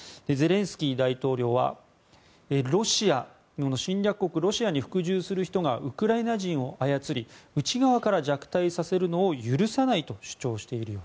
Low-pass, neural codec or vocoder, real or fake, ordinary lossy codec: none; none; real; none